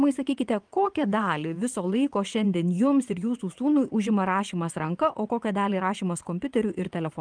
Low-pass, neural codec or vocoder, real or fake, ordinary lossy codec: 9.9 kHz; vocoder, 22.05 kHz, 80 mel bands, WaveNeXt; fake; Opus, 32 kbps